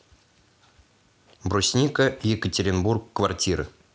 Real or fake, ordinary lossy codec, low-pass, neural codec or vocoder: real; none; none; none